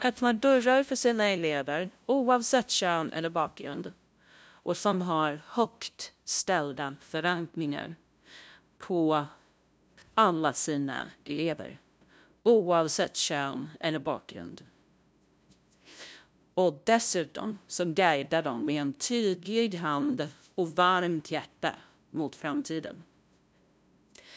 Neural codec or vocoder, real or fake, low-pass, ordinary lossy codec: codec, 16 kHz, 0.5 kbps, FunCodec, trained on LibriTTS, 25 frames a second; fake; none; none